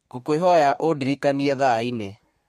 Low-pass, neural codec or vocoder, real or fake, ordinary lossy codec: 14.4 kHz; codec, 32 kHz, 1.9 kbps, SNAC; fake; MP3, 64 kbps